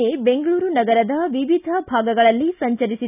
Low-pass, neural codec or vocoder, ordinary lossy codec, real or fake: 3.6 kHz; none; none; real